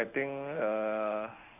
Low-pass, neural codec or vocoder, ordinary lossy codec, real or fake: 3.6 kHz; codec, 16 kHz in and 24 kHz out, 1 kbps, XY-Tokenizer; none; fake